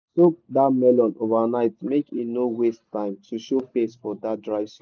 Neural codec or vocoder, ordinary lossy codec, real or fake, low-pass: none; none; real; 7.2 kHz